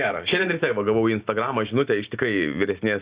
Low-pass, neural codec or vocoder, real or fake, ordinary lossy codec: 3.6 kHz; none; real; Opus, 64 kbps